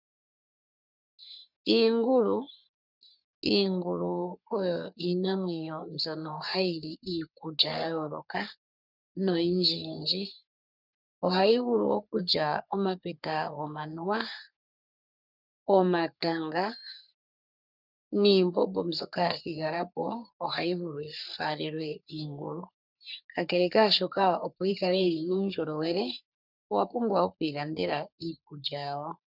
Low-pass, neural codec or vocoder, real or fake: 5.4 kHz; codec, 44.1 kHz, 3.4 kbps, Pupu-Codec; fake